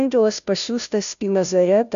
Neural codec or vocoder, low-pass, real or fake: codec, 16 kHz, 0.5 kbps, FunCodec, trained on Chinese and English, 25 frames a second; 7.2 kHz; fake